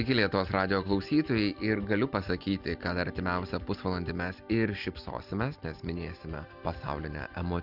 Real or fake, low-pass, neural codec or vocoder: real; 5.4 kHz; none